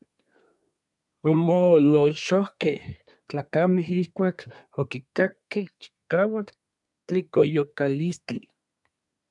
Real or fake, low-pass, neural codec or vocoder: fake; 10.8 kHz; codec, 24 kHz, 1 kbps, SNAC